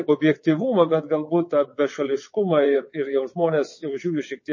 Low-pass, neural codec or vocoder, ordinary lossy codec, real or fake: 7.2 kHz; vocoder, 22.05 kHz, 80 mel bands, WaveNeXt; MP3, 32 kbps; fake